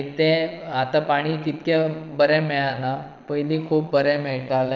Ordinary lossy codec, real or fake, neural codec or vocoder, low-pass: none; fake; vocoder, 22.05 kHz, 80 mel bands, Vocos; 7.2 kHz